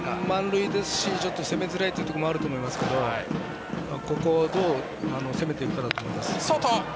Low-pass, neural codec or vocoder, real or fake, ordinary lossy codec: none; none; real; none